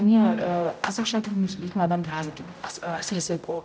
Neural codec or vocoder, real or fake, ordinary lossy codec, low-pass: codec, 16 kHz, 0.5 kbps, X-Codec, HuBERT features, trained on general audio; fake; none; none